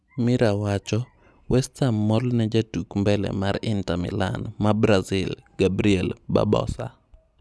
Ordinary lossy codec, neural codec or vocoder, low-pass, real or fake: none; none; none; real